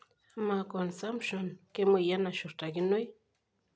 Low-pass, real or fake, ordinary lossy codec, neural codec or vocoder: none; real; none; none